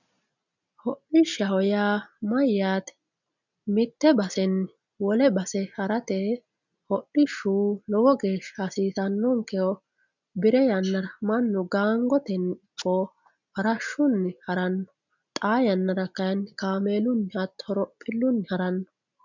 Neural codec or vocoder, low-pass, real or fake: none; 7.2 kHz; real